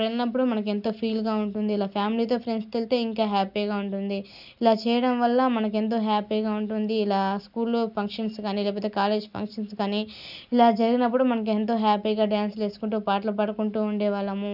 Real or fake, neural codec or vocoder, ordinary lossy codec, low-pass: real; none; none; 5.4 kHz